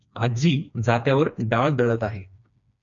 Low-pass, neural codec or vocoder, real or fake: 7.2 kHz; codec, 16 kHz, 2 kbps, FreqCodec, smaller model; fake